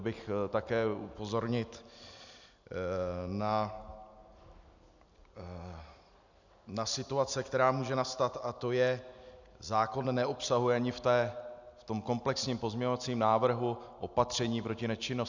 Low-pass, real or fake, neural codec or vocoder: 7.2 kHz; real; none